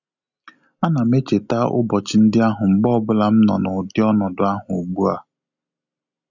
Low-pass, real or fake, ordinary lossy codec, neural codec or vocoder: 7.2 kHz; real; none; none